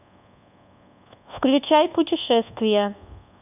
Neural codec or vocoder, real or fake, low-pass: codec, 24 kHz, 1.2 kbps, DualCodec; fake; 3.6 kHz